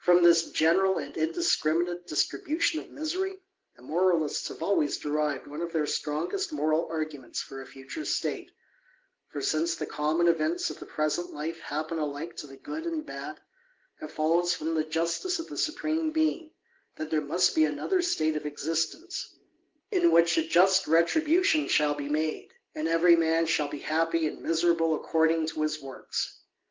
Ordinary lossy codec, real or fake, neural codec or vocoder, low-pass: Opus, 16 kbps; real; none; 7.2 kHz